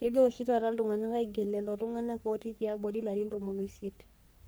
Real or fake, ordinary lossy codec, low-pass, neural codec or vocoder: fake; none; none; codec, 44.1 kHz, 3.4 kbps, Pupu-Codec